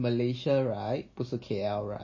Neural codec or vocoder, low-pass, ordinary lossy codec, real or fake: none; 7.2 kHz; MP3, 32 kbps; real